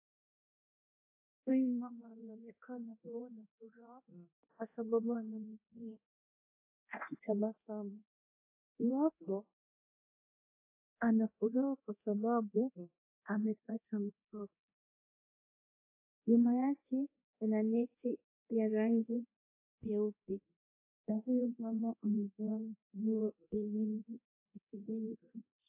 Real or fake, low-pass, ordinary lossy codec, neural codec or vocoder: fake; 3.6 kHz; AAC, 32 kbps; codec, 24 kHz, 0.9 kbps, DualCodec